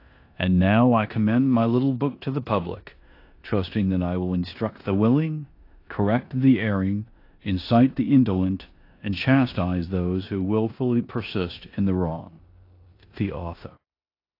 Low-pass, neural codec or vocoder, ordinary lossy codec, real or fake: 5.4 kHz; codec, 16 kHz in and 24 kHz out, 0.9 kbps, LongCat-Audio-Codec, four codebook decoder; AAC, 32 kbps; fake